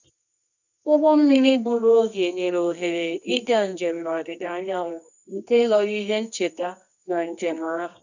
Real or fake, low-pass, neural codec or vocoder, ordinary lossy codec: fake; 7.2 kHz; codec, 24 kHz, 0.9 kbps, WavTokenizer, medium music audio release; none